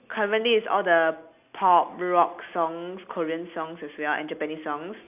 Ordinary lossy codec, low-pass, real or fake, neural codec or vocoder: AAC, 32 kbps; 3.6 kHz; real; none